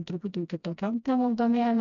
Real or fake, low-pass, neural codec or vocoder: fake; 7.2 kHz; codec, 16 kHz, 1 kbps, FreqCodec, smaller model